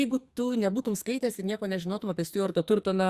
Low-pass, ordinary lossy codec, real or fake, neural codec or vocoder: 14.4 kHz; Opus, 64 kbps; fake; codec, 44.1 kHz, 2.6 kbps, SNAC